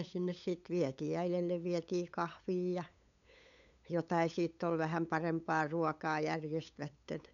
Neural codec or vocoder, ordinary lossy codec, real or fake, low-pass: codec, 16 kHz, 8 kbps, FunCodec, trained on LibriTTS, 25 frames a second; none; fake; 7.2 kHz